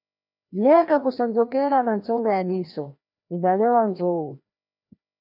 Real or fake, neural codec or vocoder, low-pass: fake; codec, 16 kHz, 1 kbps, FreqCodec, larger model; 5.4 kHz